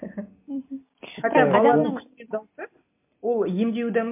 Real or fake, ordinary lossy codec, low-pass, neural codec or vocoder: real; MP3, 32 kbps; 3.6 kHz; none